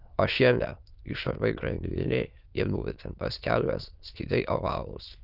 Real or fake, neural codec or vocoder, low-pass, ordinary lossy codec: fake; autoencoder, 22.05 kHz, a latent of 192 numbers a frame, VITS, trained on many speakers; 5.4 kHz; Opus, 24 kbps